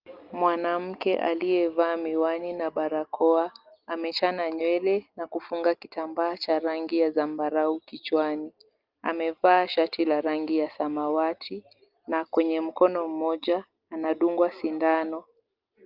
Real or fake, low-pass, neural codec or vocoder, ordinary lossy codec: real; 5.4 kHz; none; Opus, 32 kbps